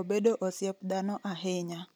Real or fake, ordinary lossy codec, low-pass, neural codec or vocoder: real; none; none; none